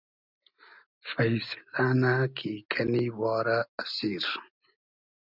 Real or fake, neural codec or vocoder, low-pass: real; none; 5.4 kHz